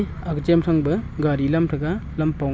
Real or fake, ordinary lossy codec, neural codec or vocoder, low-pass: real; none; none; none